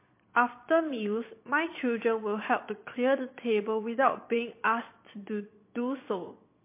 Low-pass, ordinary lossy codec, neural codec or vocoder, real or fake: 3.6 kHz; MP3, 24 kbps; vocoder, 22.05 kHz, 80 mel bands, Vocos; fake